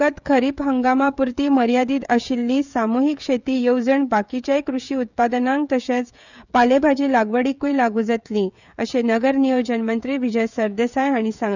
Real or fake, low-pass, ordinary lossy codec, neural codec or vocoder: fake; 7.2 kHz; none; codec, 16 kHz, 16 kbps, FreqCodec, smaller model